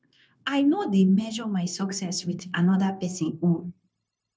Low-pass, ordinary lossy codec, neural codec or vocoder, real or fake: none; none; codec, 16 kHz, 0.9 kbps, LongCat-Audio-Codec; fake